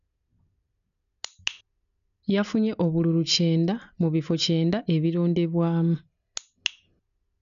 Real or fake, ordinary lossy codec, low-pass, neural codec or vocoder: real; none; 7.2 kHz; none